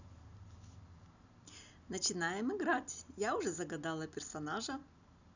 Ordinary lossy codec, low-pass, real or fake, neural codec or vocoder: none; 7.2 kHz; real; none